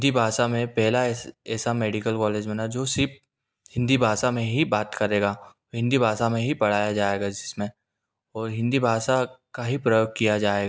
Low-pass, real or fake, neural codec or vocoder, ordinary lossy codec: none; real; none; none